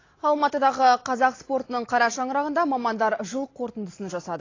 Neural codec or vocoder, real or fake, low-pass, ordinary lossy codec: none; real; 7.2 kHz; AAC, 32 kbps